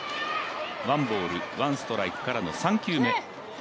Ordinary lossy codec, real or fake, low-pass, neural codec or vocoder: none; real; none; none